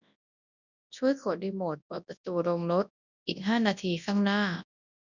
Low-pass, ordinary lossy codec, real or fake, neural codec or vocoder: 7.2 kHz; none; fake; codec, 24 kHz, 0.9 kbps, WavTokenizer, large speech release